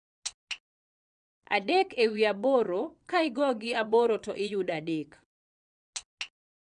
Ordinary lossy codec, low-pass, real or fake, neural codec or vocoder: none; 9.9 kHz; fake; vocoder, 22.05 kHz, 80 mel bands, Vocos